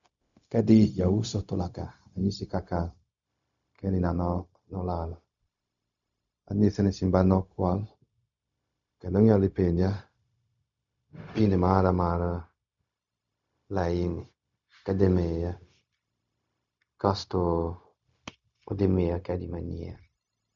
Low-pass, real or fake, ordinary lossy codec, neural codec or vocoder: 7.2 kHz; fake; none; codec, 16 kHz, 0.4 kbps, LongCat-Audio-Codec